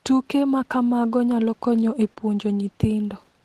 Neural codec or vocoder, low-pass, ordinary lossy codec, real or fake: none; 14.4 kHz; Opus, 16 kbps; real